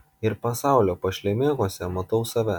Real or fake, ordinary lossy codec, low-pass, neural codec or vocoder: real; MP3, 96 kbps; 19.8 kHz; none